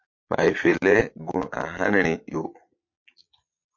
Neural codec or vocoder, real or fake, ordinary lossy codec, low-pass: vocoder, 22.05 kHz, 80 mel bands, Vocos; fake; MP3, 48 kbps; 7.2 kHz